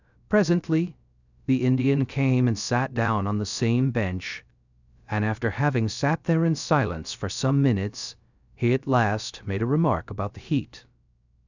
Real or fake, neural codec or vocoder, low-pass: fake; codec, 16 kHz, 0.3 kbps, FocalCodec; 7.2 kHz